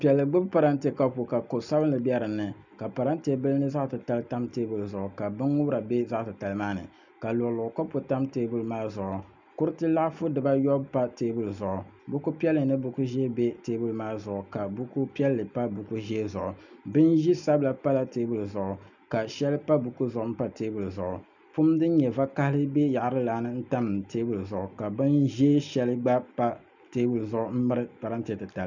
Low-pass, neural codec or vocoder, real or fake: 7.2 kHz; none; real